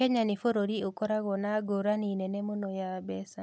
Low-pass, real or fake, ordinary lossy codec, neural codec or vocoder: none; real; none; none